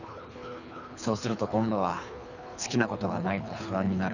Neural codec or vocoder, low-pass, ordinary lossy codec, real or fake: codec, 24 kHz, 3 kbps, HILCodec; 7.2 kHz; none; fake